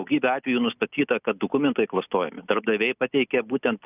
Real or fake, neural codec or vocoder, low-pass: real; none; 3.6 kHz